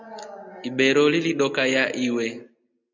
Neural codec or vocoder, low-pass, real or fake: none; 7.2 kHz; real